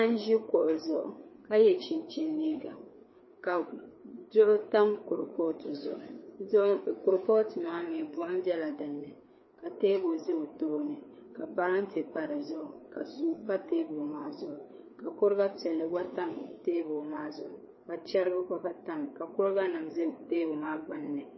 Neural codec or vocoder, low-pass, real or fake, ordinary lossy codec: codec, 16 kHz, 4 kbps, FreqCodec, larger model; 7.2 kHz; fake; MP3, 24 kbps